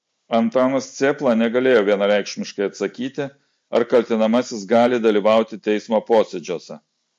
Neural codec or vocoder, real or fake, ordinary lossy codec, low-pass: none; real; MP3, 48 kbps; 10.8 kHz